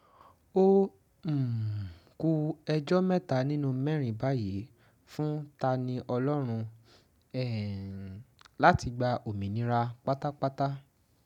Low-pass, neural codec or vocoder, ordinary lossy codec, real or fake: 19.8 kHz; none; none; real